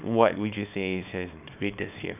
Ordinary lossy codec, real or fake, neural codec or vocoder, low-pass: none; fake; codec, 24 kHz, 0.9 kbps, WavTokenizer, small release; 3.6 kHz